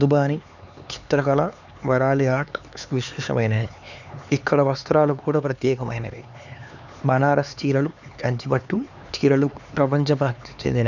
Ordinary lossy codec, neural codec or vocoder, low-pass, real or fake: none; codec, 16 kHz, 4 kbps, X-Codec, HuBERT features, trained on LibriSpeech; 7.2 kHz; fake